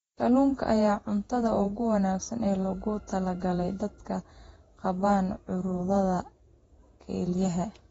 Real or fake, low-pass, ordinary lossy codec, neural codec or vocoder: fake; 19.8 kHz; AAC, 24 kbps; vocoder, 48 kHz, 128 mel bands, Vocos